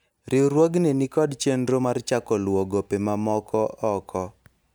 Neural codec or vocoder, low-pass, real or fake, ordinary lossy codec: none; none; real; none